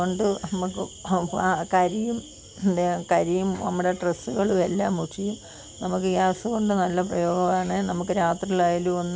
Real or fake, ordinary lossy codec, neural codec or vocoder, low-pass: real; none; none; none